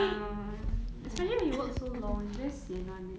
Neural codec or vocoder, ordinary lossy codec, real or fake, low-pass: none; none; real; none